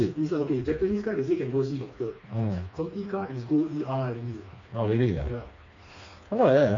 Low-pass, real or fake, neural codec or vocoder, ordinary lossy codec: 7.2 kHz; fake; codec, 16 kHz, 2 kbps, FreqCodec, smaller model; AAC, 48 kbps